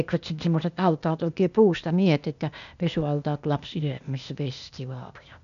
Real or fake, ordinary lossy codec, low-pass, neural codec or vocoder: fake; none; 7.2 kHz; codec, 16 kHz, 0.8 kbps, ZipCodec